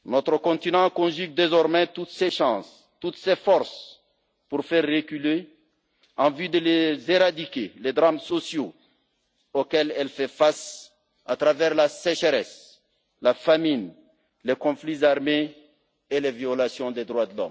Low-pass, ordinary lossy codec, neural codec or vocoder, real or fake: none; none; none; real